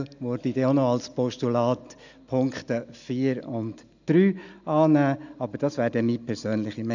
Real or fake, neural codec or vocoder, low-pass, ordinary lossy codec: real; none; 7.2 kHz; none